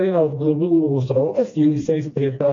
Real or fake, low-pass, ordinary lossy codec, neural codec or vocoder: fake; 7.2 kHz; AAC, 48 kbps; codec, 16 kHz, 1 kbps, FreqCodec, smaller model